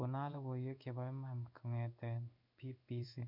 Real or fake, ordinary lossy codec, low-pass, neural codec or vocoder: fake; MP3, 48 kbps; 5.4 kHz; codec, 16 kHz in and 24 kHz out, 1 kbps, XY-Tokenizer